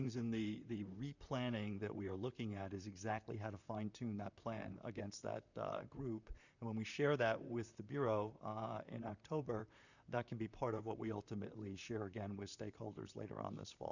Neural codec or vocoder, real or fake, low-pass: vocoder, 44.1 kHz, 128 mel bands, Pupu-Vocoder; fake; 7.2 kHz